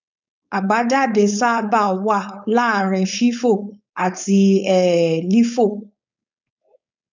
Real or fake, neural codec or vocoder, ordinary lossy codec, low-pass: fake; codec, 16 kHz, 4.8 kbps, FACodec; none; 7.2 kHz